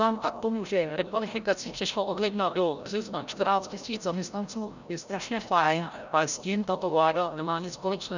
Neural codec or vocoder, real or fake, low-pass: codec, 16 kHz, 0.5 kbps, FreqCodec, larger model; fake; 7.2 kHz